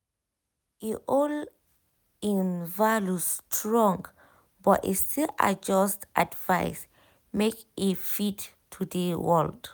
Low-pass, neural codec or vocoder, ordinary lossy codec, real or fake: none; none; none; real